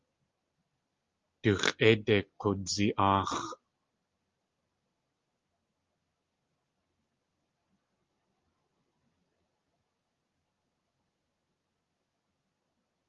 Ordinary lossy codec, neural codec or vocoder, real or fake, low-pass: Opus, 32 kbps; none; real; 7.2 kHz